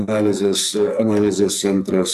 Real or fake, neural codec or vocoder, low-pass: fake; codec, 44.1 kHz, 2.6 kbps, SNAC; 14.4 kHz